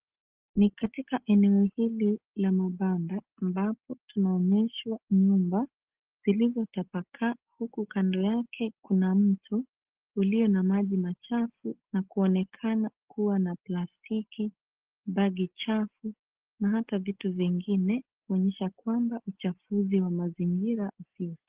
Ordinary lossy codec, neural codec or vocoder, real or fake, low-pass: Opus, 16 kbps; none; real; 3.6 kHz